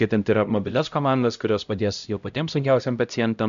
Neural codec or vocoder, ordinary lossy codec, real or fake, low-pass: codec, 16 kHz, 0.5 kbps, X-Codec, HuBERT features, trained on LibriSpeech; AAC, 96 kbps; fake; 7.2 kHz